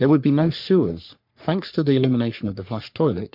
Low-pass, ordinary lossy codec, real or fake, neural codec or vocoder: 5.4 kHz; MP3, 48 kbps; fake; codec, 44.1 kHz, 3.4 kbps, Pupu-Codec